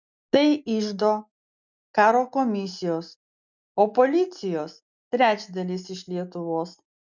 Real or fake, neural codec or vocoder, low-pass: real; none; 7.2 kHz